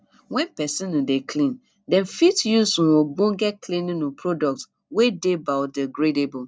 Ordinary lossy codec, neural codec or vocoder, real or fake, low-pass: none; none; real; none